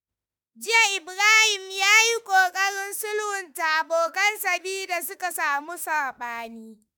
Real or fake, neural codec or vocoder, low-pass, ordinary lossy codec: fake; autoencoder, 48 kHz, 32 numbers a frame, DAC-VAE, trained on Japanese speech; none; none